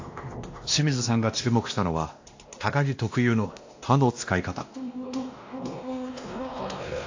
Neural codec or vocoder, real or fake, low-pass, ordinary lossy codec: codec, 16 kHz, 1 kbps, X-Codec, WavLM features, trained on Multilingual LibriSpeech; fake; 7.2 kHz; AAC, 48 kbps